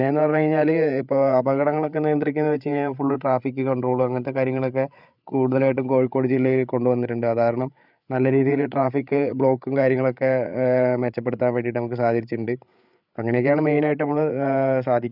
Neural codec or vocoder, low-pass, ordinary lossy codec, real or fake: codec, 16 kHz, 8 kbps, FreqCodec, larger model; 5.4 kHz; none; fake